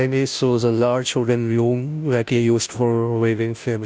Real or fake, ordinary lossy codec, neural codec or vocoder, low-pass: fake; none; codec, 16 kHz, 0.5 kbps, FunCodec, trained on Chinese and English, 25 frames a second; none